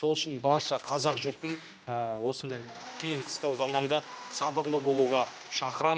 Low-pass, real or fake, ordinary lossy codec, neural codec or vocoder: none; fake; none; codec, 16 kHz, 1 kbps, X-Codec, HuBERT features, trained on general audio